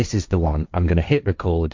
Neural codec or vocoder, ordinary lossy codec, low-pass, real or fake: codec, 16 kHz, 1.1 kbps, Voila-Tokenizer; MP3, 64 kbps; 7.2 kHz; fake